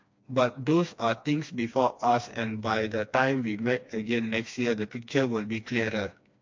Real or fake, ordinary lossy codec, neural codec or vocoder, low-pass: fake; MP3, 48 kbps; codec, 16 kHz, 2 kbps, FreqCodec, smaller model; 7.2 kHz